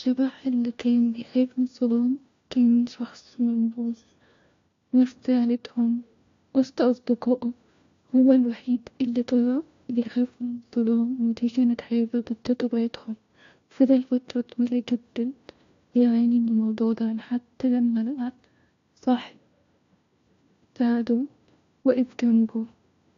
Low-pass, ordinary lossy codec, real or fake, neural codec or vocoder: 7.2 kHz; AAC, 48 kbps; fake; codec, 16 kHz, 1 kbps, FunCodec, trained on LibriTTS, 50 frames a second